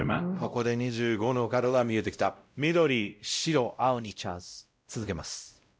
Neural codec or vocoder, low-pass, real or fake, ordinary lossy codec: codec, 16 kHz, 0.5 kbps, X-Codec, WavLM features, trained on Multilingual LibriSpeech; none; fake; none